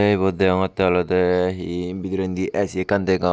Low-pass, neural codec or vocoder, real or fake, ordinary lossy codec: none; none; real; none